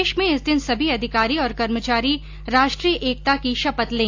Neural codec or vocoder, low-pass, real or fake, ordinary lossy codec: none; 7.2 kHz; real; AAC, 48 kbps